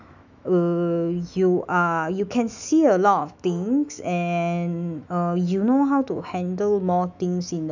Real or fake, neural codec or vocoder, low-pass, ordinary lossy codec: fake; autoencoder, 48 kHz, 128 numbers a frame, DAC-VAE, trained on Japanese speech; 7.2 kHz; none